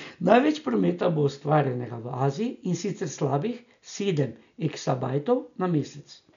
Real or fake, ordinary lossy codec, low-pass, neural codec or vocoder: real; none; 7.2 kHz; none